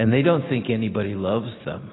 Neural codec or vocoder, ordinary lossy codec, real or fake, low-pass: none; AAC, 16 kbps; real; 7.2 kHz